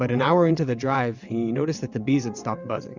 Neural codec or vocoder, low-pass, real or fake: vocoder, 22.05 kHz, 80 mel bands, WaveNeXt; 7.2 kHz; fake